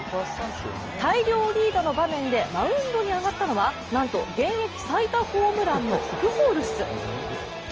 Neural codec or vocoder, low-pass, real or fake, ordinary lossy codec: none; 7.2 kHz; real; Opus, 24 kbps